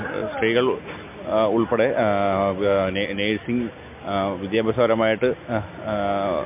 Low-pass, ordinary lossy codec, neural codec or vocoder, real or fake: 3.6 kHz; MP3, 32 kbps; none; real